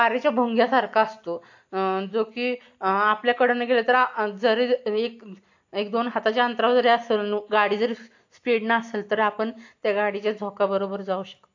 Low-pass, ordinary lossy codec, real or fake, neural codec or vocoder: 7.2 kHz; AAC, 48 kbps; real; none